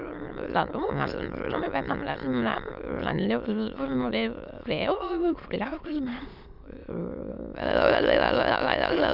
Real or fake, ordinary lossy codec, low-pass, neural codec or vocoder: fake; none; 5.4 kHz; autoencoder, 22.05 kHz, a latent of 192 numbers a frame, VITS, trained on many speakers